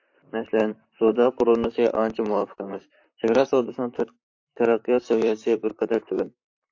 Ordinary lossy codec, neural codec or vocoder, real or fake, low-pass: AAC, 48 kbps; vocoder, 44.1 kHz, 128 mel bands, Pupu-Vocoder; fake; 7.2 kHz